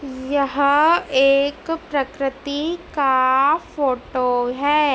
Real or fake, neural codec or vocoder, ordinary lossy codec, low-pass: real; none; none; none